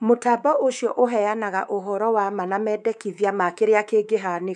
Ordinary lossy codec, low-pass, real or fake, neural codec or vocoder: none; 10.8 kHz; fake; codec, 24 kHz, 3.1 kbps, DualCodec